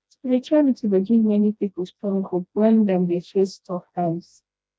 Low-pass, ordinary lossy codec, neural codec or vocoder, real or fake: none; none; codec, 16 kHz, 1 kbps, FreqCodec, smaller model; fake